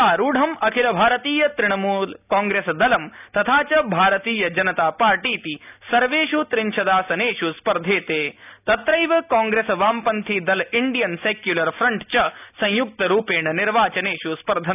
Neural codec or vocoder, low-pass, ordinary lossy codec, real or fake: none; 3.6 kHz; none; real